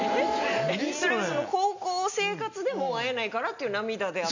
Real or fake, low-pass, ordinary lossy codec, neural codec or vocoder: real; 7.2 kHz; none; none